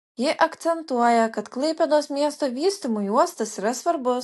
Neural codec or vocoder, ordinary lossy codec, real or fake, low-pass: none; AAC, 64 kbps; real; 14.4 kHz